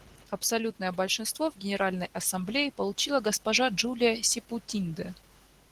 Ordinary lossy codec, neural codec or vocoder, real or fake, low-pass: Opus, 16 kbps; none; real; 14.4 kHz